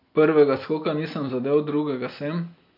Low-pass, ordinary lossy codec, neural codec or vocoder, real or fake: 5.4 kHz; MP3, 48 kbps; none; real